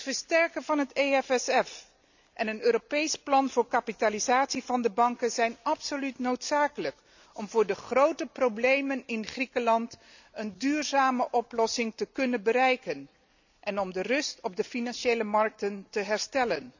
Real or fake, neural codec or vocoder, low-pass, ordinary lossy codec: real; none; 7.2 kHz; none